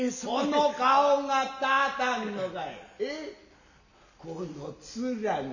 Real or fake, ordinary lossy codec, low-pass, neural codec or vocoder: real; none; 7.2 kHz; none